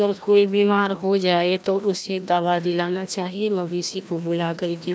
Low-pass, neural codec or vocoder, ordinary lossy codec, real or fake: none; codec, 16 kHz, 1 kbps, FreqCodec, larger model; none; fake